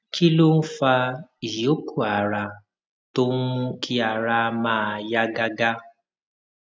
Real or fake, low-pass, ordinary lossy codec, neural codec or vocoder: real; none; none; none